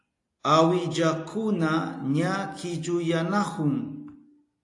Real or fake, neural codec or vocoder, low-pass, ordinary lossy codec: real; none; 10.8 kHz; AAC, 48 kbps